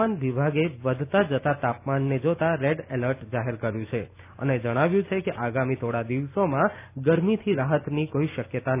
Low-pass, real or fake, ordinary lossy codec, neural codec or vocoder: 3.6 kHz; real; none; none